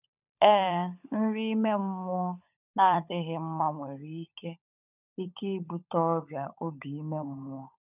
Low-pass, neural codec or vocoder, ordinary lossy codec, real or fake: 3.6 kHz; codec, 16 kHz, 16 kbps, FunCodec, trained on LibriTTS, 50 frames a second; none; fake